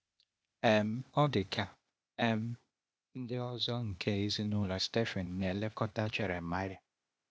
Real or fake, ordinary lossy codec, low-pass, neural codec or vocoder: fake; none; none; codec, 16 kHz, 0.8 kbps, ZipCodec